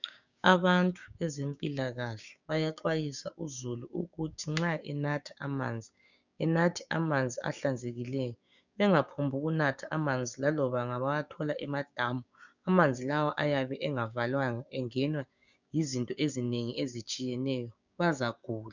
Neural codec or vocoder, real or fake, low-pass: codec, 16 kHz, 6 kbps, DAC; fake; 7.2 kHz